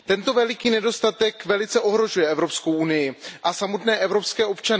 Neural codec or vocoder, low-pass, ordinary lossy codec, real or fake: none; none; none; real